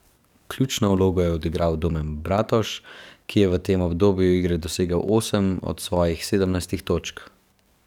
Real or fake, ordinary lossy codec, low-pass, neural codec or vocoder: fake; none; 19.8 kHz; codec, 44.1 kHz, 7.8 kbps, DAC